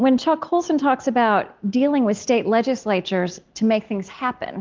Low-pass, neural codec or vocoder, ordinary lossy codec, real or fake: 7.2 kHz; none; Opus, 32 kbps; real